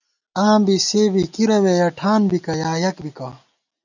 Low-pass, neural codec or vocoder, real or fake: 7.2 kHz; none; real